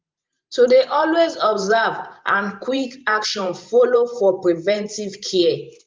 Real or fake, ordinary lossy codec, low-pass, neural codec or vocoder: fake; Opus, 24 kbps; 7.2 kHz; vocoder, 44.1 kHz, 128 mel bands every 512 samples, BigVGAN v2